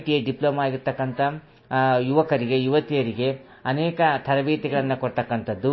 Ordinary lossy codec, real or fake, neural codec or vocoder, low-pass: MP3, 24 kbps; real; none; 7.2 kHz